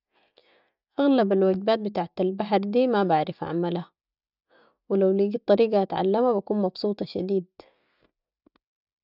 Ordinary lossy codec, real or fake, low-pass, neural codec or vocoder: none; real; 5.4 kHz; none